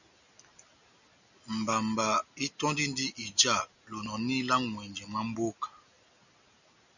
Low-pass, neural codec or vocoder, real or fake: 7.2 kHz; none; real